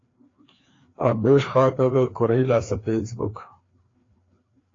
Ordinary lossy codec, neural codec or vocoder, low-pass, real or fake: AAC, 32 kbps; codec, 16 kHz, 2 kbps, FreqCodec, larger model; 7.2 kHz; fake